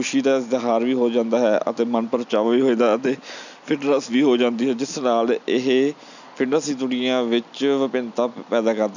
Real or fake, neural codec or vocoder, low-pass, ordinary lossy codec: real; none; 7.2 kHz; none